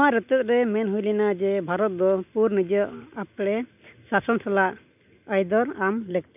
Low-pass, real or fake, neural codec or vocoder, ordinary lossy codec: 3.6 kHz; real; none; none